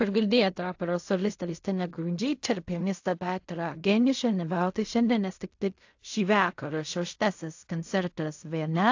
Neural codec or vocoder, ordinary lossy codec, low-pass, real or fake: codec, 16 kHz in and 24 kHz out, 0.4 kbps, LongCat-Audio-Codec, fine tuned four codebook decoder; AAC, 48 kbps; 7.2 kHz; fake